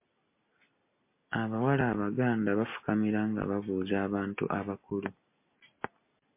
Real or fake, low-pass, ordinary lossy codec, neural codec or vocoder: real; 3.6 kHz; MP3, 24 kbps; none